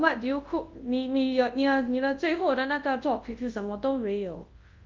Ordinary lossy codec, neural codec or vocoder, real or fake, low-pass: Opus, 32 kbps; codec, 24 kHz, 0.9 kbps, WavTokenizer, large speech release; fake; 7.2 kHz